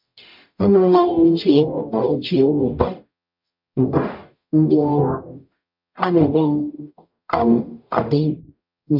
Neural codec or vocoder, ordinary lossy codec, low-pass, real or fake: codec, 44.1 kHz, 0.9 kbps, DAC; MP3, 48 kbps; 5.4 kHz; fake